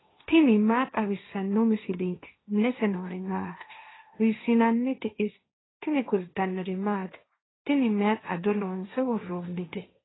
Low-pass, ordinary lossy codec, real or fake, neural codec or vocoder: 7.2 kHz; AAC, 16 kbps; fake; codec, 16 kHz, 1.1 kbps, Voila-Tokenizer